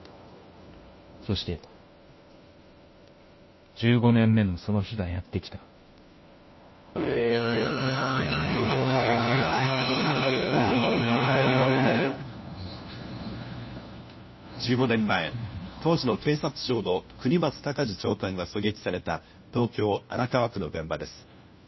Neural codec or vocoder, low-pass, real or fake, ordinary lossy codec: codec, 16 kHz, 1 kbps, FunCodec, trained on LibriTTS, 50 frames a second; 7.2 kHz; fake; MP3, 24 kbps